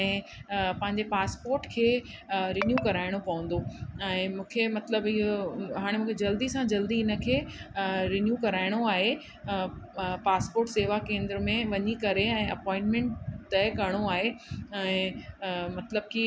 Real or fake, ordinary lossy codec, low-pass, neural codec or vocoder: real; none; none; none